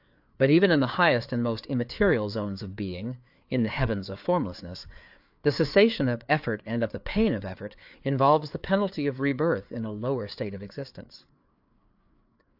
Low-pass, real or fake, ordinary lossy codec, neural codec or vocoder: 5.4 kHz; fake; AAC, 48 kbps; codec, 16 kHz, 4 kbps, FreqCodec, larger model